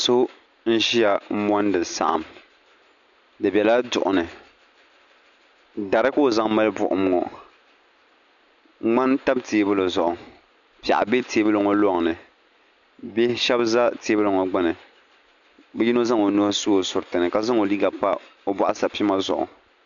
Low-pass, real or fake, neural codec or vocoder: 7.2 kHz; real; none